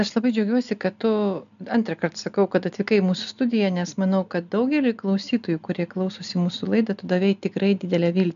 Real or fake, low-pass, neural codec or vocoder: real; 7.2 kHz; none